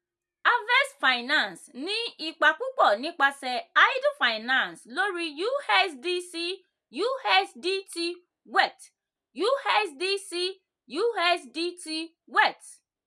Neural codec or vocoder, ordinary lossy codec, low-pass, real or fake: none; none; none; real